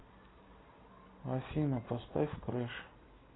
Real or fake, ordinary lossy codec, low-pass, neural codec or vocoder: real; AAC, 16 kbps; 7.2 kHz; none